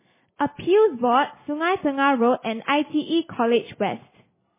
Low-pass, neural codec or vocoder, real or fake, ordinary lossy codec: 3.6 kHz; none; real; MP3, 16 kbps